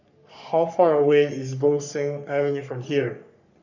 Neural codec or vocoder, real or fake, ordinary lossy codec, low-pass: codec, 44.1 kHz, 3.4 kbps, Pupu-Codec; fake; none; 7.2 kHz